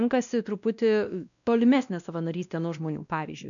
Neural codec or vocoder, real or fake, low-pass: codec, 16 kHz, 1 kbps, X-Codec, WavLM features, trained on Multilingual LibriSpeech; fake; 7.2 kHz